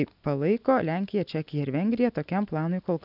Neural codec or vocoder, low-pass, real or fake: none; 5.4 kHz; real